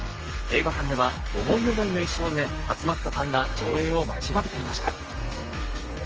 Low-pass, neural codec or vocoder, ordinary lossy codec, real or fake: 7.2 kHz; codec, 32 kHz, 1.9 kbps, SNAC; Opus, 24 kbps; fake